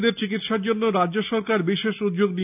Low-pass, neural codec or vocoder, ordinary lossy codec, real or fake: 3.6 kHz; none; none; real